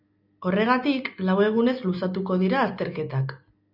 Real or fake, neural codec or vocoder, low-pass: real; none; 5.4 kHz